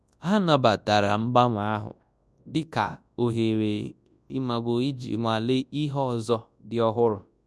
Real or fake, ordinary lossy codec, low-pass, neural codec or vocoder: fake; none; none; codec, 24 kHz, 0.9 kbps, WavTokenizer, large speech release